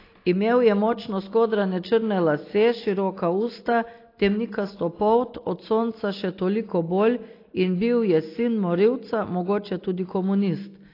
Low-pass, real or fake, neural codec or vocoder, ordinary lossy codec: 5.4 kHz; real; none; AAC, 32 kbps